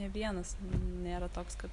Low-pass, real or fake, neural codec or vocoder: 10.8 kHz; real; none